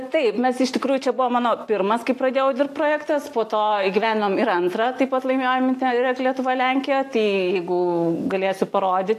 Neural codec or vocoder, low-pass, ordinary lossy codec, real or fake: none; 14.4 kHz; AAC, 64 kbps; real